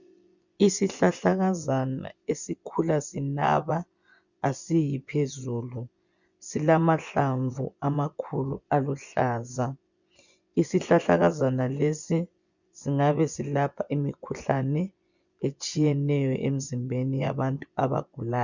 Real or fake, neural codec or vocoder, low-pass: fake; vocoder, 24 kHz, 100 mel bands, Vocos; 7.2 kHz